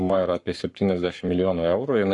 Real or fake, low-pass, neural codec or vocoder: fake; 10.8 kHz; codec, 44.1 kHz, 7.8 kbps, Pupu-Codec